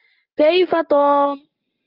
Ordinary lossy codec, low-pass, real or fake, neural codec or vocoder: Opus, 32 kbps; 5.4 kHz; real; none